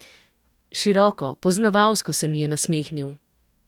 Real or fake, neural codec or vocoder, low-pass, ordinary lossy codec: fake; codec, 44.1 kHz, 2.6 kbps, DAC; 19.8 kHz; none